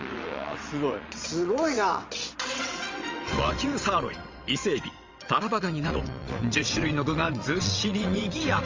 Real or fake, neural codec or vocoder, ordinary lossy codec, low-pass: fake; vocoder, 22.05 kHz, 80 mel bands, WaveNeXt; Opus, 32 kbps; 7.2 kHz